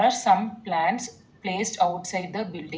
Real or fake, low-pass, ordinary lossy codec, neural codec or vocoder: real; none; none; none